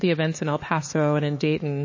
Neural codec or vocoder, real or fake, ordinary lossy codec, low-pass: codec, 16 kHz, 4 kbps, X-Codec, HuBERT features, trained on LibriSpeech; fake; MP3, 32 kbps; 7.2 kHz